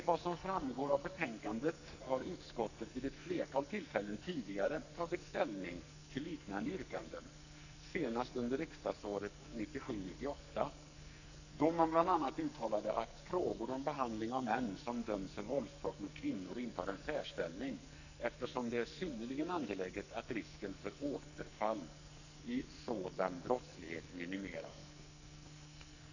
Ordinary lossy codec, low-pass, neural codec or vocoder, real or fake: none; 7.2 kHz; codec, 44.1 kHz, 2.6 kbps, SNAC; fake